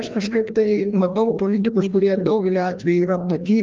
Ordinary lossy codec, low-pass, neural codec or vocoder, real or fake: Opus, 32 kbps; 7.2 kHz; codec, 16 kHz, 1 kbps, FreqCodec, larger model; fake